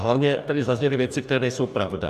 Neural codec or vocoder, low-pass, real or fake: codec, 44.1 kHz, 2.6 kbps, DAC; 14.4 kHz; fake